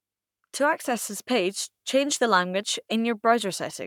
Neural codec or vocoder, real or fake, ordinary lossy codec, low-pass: codec, 44.1 kHz, 7.8 kbps, Pupu-Codec; fake; none; 19.8 kHz